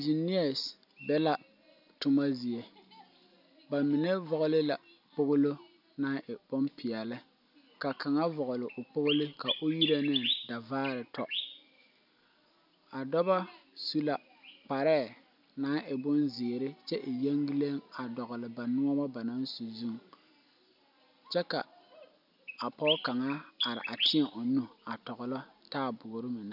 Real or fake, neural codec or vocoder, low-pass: real; none; 5.4 kHz